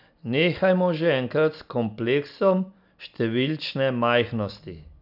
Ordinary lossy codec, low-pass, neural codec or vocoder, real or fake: none; 5.4 kHz; none; real